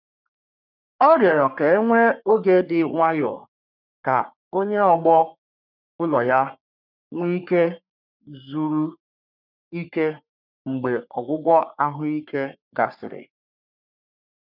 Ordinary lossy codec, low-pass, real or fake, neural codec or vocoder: none; 5.4 kHz; fake; codec, 44.1 kHz, 3.4 kbps, Pupu-Codec